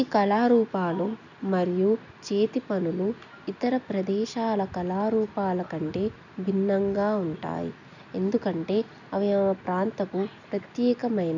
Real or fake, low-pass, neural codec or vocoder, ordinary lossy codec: real; 7.2 kHz; none; none